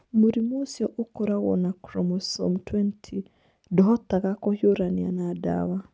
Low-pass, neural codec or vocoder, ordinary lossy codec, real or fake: none; none; none; real